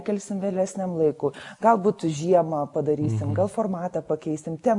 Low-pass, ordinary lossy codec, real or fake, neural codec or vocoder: 10.8 kHz; AAC, 64 kbps; real; none